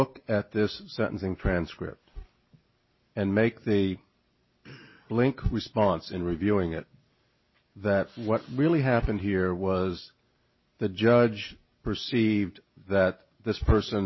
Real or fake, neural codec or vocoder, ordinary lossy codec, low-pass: real; none; MP3, 24 kbps; 7.2 kHz